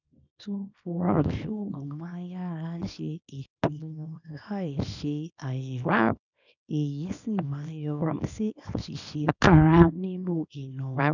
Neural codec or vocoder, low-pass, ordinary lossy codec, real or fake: codec, 24 kHz, 0.9 kbps, WavTokenizer, small release; 7.2 kHz; none; fake